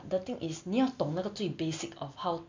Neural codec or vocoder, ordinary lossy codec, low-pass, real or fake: none; MP3, 64 kbps; 7.2 kHz; real